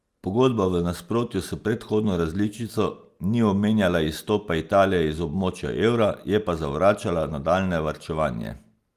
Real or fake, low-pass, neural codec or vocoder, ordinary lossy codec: real; 14.4 kHz; none; Opus, 32 kbps